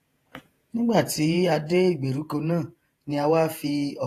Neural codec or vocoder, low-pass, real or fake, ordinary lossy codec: vocoder, 48 kHz, 128 mel bands, Vocos; 14.4 kHz; fake; AAC, 48 kbps